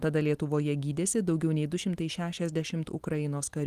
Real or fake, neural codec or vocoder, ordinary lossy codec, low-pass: real; none; Opus, 24 kbps; 14.4 kHz